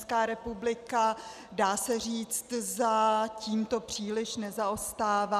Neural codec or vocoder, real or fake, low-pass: none; real; 14.4 kHz